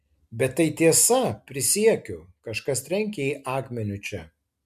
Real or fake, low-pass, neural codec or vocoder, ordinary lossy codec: real; 14.4 kHz; none; MP3, 96 kbps